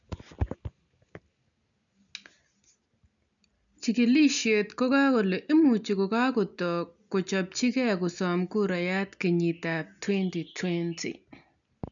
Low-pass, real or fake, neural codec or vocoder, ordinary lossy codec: 7.2 kHz; real; none; none